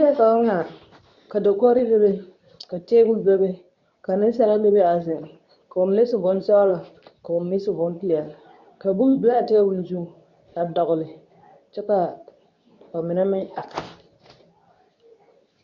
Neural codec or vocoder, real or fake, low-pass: codec, 24 kHz, 0.9 kbps, WavTokenizer, medium speech release version 2; fake; 7.2 kHz